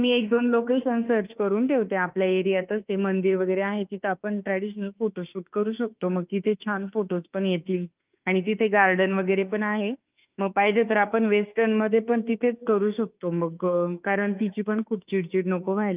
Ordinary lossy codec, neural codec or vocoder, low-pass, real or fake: Opus, 24 kbps; autoencoder, 48 kHz, 32 numbers a frame, DAC-VAE, trained on Japanese speech; 3.6 kHz; fake